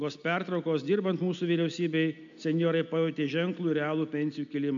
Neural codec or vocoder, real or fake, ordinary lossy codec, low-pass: none; real; MP3, 64 kbps; 7.2 kHz